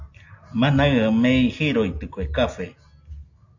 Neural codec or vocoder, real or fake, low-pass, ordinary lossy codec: none; real; 7.2 kHz; AAC, 48 kbps